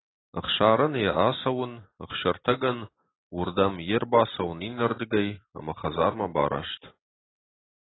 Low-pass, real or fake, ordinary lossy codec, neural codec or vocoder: 7.2 kHz; real; AAC, 16 kbps; none